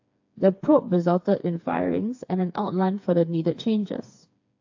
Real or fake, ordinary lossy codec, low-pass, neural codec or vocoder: fake; AAC, 48 kbps; 7.2 kHz; codec, 16 kHz, 4 kbps, FreqCodec, smaller model